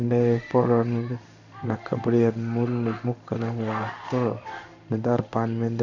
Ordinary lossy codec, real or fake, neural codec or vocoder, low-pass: none; fake; codec, 16 kHz in and 24 kHz out, 1 kbps, XY-Tokenizer; 7.2 kHz